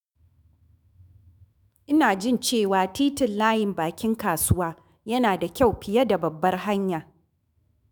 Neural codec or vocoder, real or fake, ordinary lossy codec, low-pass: autoencoder, 48 kHz, 128 numbers a frame, DAC-VAE, trained on Japanese speech; fake; none; none